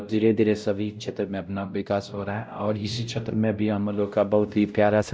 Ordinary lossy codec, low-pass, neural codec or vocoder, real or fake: none; none; codec, 16 kHz, 0.5 kbps, X-Codec, WavLM features, trained on Multilingual LibriSpeech; fake